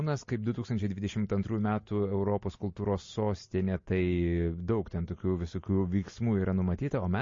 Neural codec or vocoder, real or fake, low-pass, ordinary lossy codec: none; real; 7.2 kHz; MP3, 32 kbps